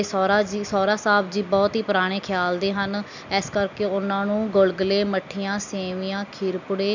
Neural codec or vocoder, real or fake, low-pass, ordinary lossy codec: none; real; 7.2 kHz; none